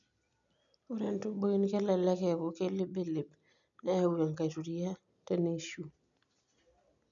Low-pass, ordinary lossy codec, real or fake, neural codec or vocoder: 7.2 kHz; none; real; none